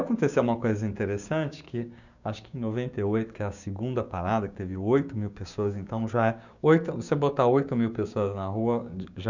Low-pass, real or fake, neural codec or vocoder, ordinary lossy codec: 7.2 kHz; fake; codec, 16 kHz, 6 kbps, DAC; none